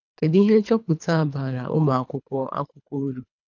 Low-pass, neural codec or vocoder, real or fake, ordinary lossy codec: 7.2 kHz; codec, 24 kHz, 3 kbps, HILCodec; fake; none